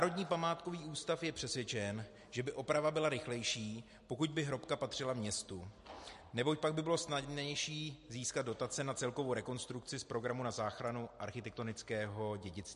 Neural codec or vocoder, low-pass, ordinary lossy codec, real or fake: none; 14.4 kHz; MP3, 48 kbps; real